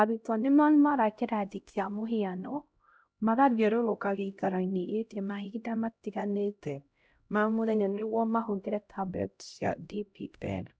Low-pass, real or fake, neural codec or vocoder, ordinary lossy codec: none; fake; codec, 16 kHz, 0.5 kbps, X-Codec, HuBERT features, trained on LibriSpeech; none